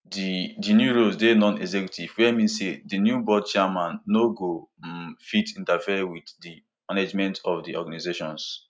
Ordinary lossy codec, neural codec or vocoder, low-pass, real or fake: none; none; none; real